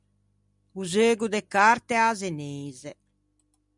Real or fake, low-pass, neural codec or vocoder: real; 10.8 kHz; none